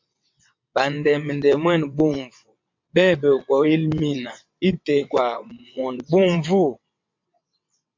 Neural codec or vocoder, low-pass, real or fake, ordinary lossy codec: vocoder, 22.05 kHz, 80 mel bands, WaveNeXt; 7.2 kHz; fake; MP3, 48 kbps